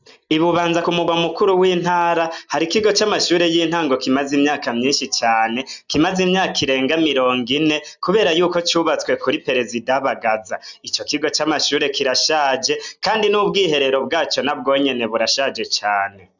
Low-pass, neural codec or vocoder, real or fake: 7.2 kHz; none; real